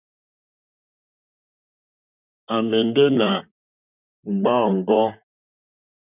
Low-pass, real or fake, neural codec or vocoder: 3.6 kHz; fake; vocoder, 44.1 kHz, 128 mel bands, Pupu-Vocoder